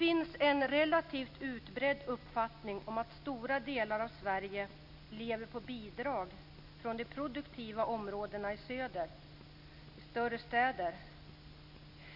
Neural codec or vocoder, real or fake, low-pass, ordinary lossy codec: none; real; 5.4 kHz; none